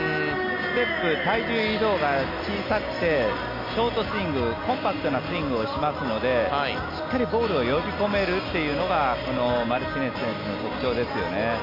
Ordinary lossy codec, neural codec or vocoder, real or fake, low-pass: none; none; real; 5.4 kHz